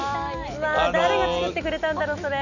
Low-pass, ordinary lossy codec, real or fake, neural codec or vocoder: 7.2 kHz; none; real; none